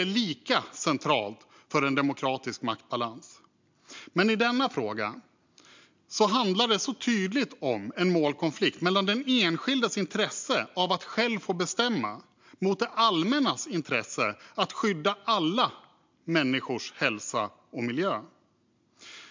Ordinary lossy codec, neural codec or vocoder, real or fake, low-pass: MP3, 64 kbps; none; real; 7.2 kHz